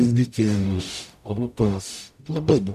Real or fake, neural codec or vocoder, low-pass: fake; codec, 44.1 kHz, 0.9 kbps, DAC; 14.4 kHz